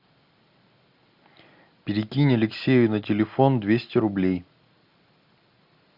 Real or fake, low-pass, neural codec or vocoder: real; 5.4 kHz; none